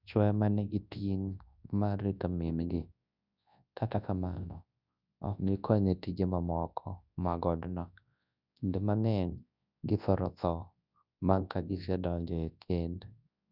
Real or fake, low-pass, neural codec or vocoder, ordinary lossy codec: fake; 5.4 kHz; codec, 24 kHz, 0.9 kbps, WavTokenizer, large speech release; none